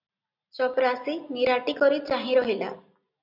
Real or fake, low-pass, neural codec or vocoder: real; 5.4 kHz; none